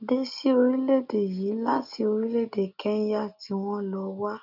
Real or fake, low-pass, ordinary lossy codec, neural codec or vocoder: real; 5.4 kHz; none; none